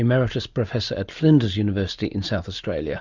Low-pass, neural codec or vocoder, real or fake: 7.2 kHz; none; real